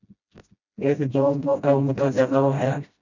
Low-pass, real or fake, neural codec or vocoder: 7.2 kHz; fake; codec, 16 kHz, 0.5 kbps, FreqCodec, smaller model